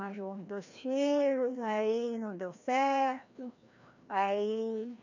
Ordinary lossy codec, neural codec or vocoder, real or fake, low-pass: none; codec, 16 kHz, 1 kbps, FreqCodec, larger model; fake; 7.2 kHz